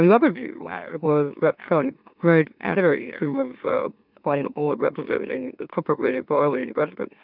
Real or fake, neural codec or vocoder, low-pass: fake; autoencoder, 44.1 kHz, a latent of 192 numbers a frame, MeloTTS; 5.4 kHz